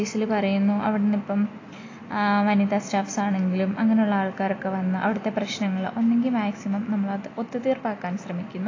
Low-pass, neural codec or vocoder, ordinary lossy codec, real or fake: 7.2 kHz; none; AAC, 32 kbps; real